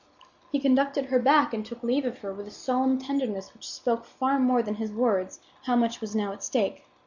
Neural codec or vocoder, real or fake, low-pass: none; real; 7.2 kHz